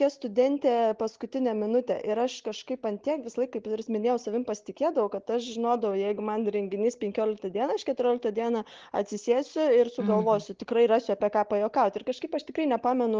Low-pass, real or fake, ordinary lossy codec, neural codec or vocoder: 7.2 kHz; real; Opus, 16 kbps; none